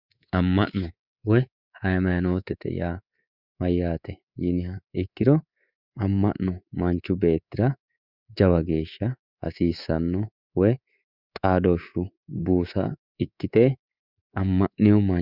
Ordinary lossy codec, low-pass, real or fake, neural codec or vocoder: Opus, 64 kbps; 5.4 kHz; fake; codec, 24 kHz, 3.1 kbps, DualCodec